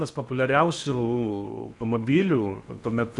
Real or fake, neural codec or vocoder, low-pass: fake; codec, 16 kHz in and 24 kHz out, 0.8 kbps, FocalCodec, streaming, 65536 codes; 10.8 kHz